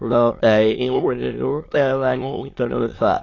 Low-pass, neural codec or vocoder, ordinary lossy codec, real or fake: 7.2 kHz; autoencoder, 22.05 kHz, a latent of 192 numbers a frame, VITS, trained on many speakers; AAC, 32 kbps; fake